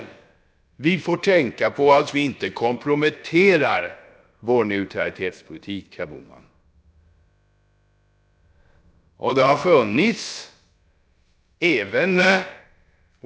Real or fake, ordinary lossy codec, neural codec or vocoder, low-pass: fake; none; codec, 16 kHz, about 1 kbps, DyCAST, with the encoder's durations; none